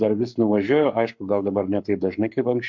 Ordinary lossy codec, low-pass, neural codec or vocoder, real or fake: MP3, 64 kbps; 7.2 kHz; codec, 44.1 kHz, 7.8 kbps, Pupu-Codec; fake